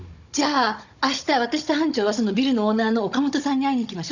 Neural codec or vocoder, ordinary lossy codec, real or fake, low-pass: codec, 16 kHz, 16 kbps, FunCodec, trained on Chinese and English, 50 frames a second; none; fake; 7.2 kHz